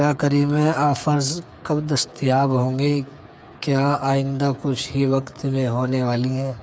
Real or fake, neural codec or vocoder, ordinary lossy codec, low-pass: fake; codec, 16 kHz, 8 kbps, FreqCodec, smaller model; none; none